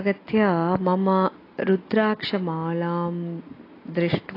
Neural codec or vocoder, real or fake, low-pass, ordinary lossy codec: none; real; 5.4 kHz; AAC, 24 kbps